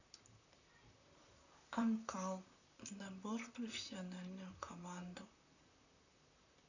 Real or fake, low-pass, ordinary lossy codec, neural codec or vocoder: fake; 7.2 kHz; none; vocoder, 44.1 kHz, 128 mel bands, Pupu-Vocoder